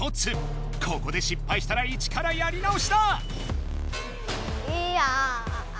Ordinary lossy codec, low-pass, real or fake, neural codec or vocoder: none; none; real; none